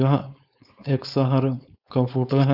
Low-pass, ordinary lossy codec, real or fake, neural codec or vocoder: 5.4 kHz; none; fake; codec, 16 kHz, 4.8 kbps, FACodec